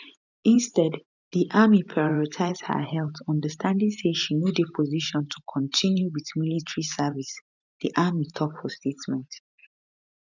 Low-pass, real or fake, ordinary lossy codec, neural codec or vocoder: 7.2 kHz; fake; none; vocoder, 44.1 kHz, 128 mel bands every 512 samples, BigVGAN v2